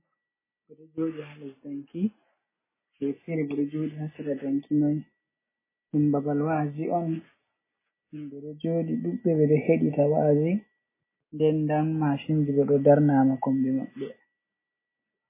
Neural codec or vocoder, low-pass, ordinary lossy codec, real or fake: none; 3.6 kHz; MP3, 16 kbps; real